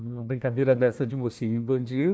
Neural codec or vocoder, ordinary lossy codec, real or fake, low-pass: codec, 16 kHz, 1 kbps, FunCodec, trained on Chinese and English, 50 frames a second; none; fake; none